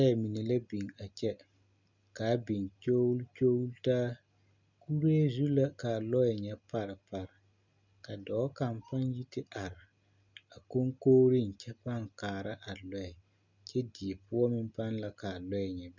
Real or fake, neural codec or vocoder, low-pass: real; none; 7.2 kHz